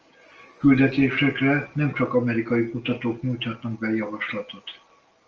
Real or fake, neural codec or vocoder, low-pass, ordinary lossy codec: real; none; 7.2 kHz; Opus, 24 kbps